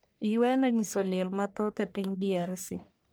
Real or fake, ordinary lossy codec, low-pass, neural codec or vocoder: fake; none; none; codec, 44.1 kHz, 1.7 kbps, Pupu-Codec